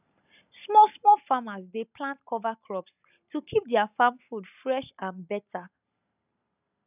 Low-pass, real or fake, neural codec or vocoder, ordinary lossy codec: 3.6 kHz; real; none; none